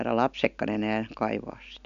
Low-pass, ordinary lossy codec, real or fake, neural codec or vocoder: 7.2 kHz; none; real; none